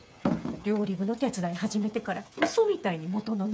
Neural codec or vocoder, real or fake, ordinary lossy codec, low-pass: codec, 16 kHz, 8 kbps, FreqCodec, smaller model; fake; none; none